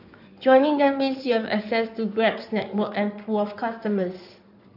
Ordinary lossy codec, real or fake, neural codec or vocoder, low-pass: none; fake; codec, 16 kHz in and 24 kHz out, 2.2 kbps, FireRedTTS-2 codec; 5.4 kHz